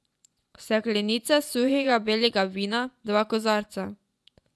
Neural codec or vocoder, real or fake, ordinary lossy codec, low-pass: vocoder, 24 kHz, 100 mel bands, Vocos; fake; none; none